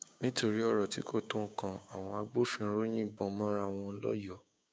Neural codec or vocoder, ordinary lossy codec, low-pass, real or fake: codec, 16 kHz, 6 kbps, DAC; none; none; fake